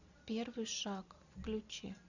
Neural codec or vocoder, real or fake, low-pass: none; real; 7.2 kHz